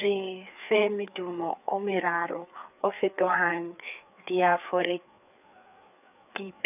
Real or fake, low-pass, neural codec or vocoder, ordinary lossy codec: fake; 3.6 kHz; codec, 16 kHz, 4 kbps, FreqCodec, larger model; none